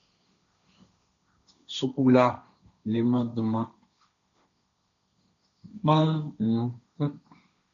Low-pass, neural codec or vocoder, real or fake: 7.2 kHz; codec, 16 kHz, 1.1 kbps, Voila-Tokenizer; fake